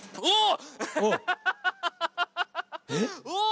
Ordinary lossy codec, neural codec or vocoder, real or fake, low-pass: none; none; real; none